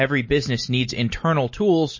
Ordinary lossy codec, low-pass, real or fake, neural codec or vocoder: MP3, 32 kbps; 7.2 kHz; real; none